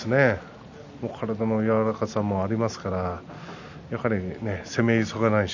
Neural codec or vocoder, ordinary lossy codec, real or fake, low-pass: none; none; real; 7.2 kHz